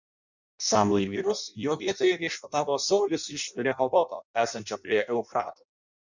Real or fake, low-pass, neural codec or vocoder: fake; 7.2 kHz; codec, 16 kHz in and 24 kHz out, 0.6 kbps, FireRedTTS-2 codec